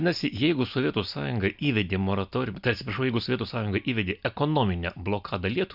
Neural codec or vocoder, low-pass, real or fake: none; 5.4 kHz; real